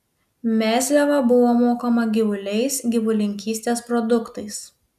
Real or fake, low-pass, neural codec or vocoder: real; 14.4 kHz; none